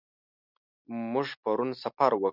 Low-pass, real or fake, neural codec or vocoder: 5.4 kHz; real; none